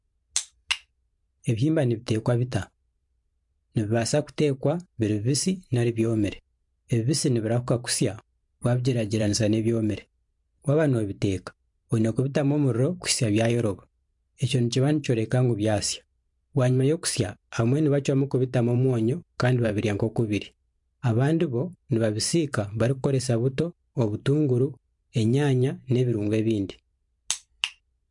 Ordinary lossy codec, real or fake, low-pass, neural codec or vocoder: MP3, 64 kbps; fake; 10.8 kHz; vocoder, 44.1 kHz, 128 mel bands every 512 samples, BigVGAN v2